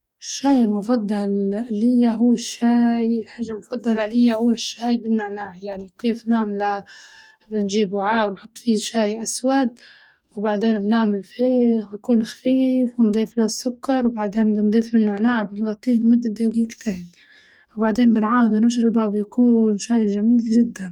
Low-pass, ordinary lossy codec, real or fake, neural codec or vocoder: 19.8 kHz; none; fake; codec, 44.1 kHz, 2.6 kbps, DAC